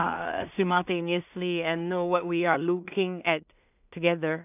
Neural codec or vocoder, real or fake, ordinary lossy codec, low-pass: codec, 16 kHz in and 24 kHz out, 0.4 kbps, LongCat-Audio-Codec, two codebook decoder; fake; none; 3.6 kHz